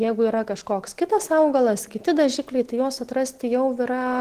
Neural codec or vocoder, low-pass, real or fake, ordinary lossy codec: none; 14.4 kHz; real; Opus, 16 kbps